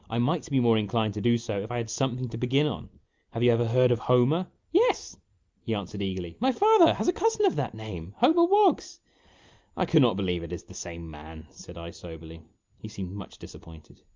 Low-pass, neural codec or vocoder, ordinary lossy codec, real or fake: 7.2 kHz; none; Opus, 24 kbps; real